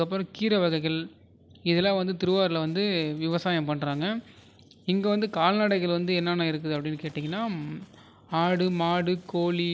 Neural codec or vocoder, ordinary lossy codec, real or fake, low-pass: none; none; real; none